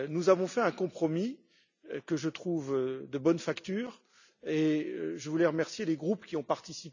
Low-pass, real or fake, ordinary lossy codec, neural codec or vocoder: 7.2 kHz; real; none; none